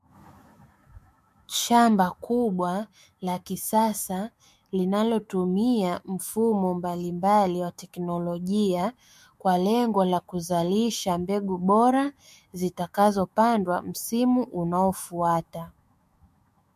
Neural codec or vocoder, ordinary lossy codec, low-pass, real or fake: autoencoder, 48 kHz, 128 numbers a frame, DAC-VAE, trained on Japanese speech; MP3, 64 kbps; 14.4 kHz; fake